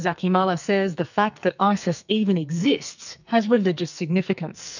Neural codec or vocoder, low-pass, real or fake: codec, 44.1 kHz, 2.6 kbps, SNAC; 7.2 kHz; fake